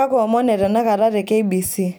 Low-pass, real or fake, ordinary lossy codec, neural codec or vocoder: none; real; none; none